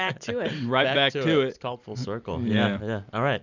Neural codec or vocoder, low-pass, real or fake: none; 7.2 kHz; real